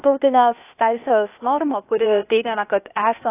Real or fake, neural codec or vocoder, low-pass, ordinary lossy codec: fake; codec, 16 kHz, 0.8 kbps, ZipCodec; 3.6 kHz; AAC, 24 kbps